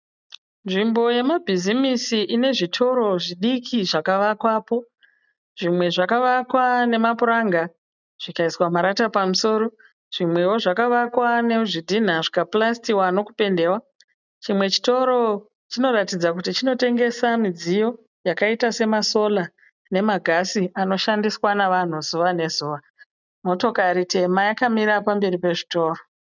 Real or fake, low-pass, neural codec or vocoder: real; 7.2 kHz; none